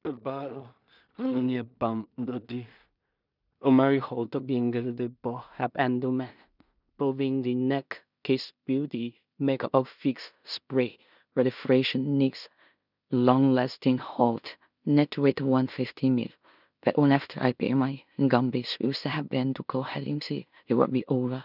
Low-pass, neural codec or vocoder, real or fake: 5.4 kHz; codec, 16 kHz in and 24 kHz out, 0.4 kbps, LongCat-Audio-Codec, two codebook decoder; fake